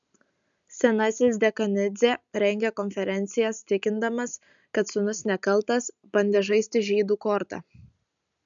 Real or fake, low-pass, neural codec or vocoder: real; 7.2 kHz; none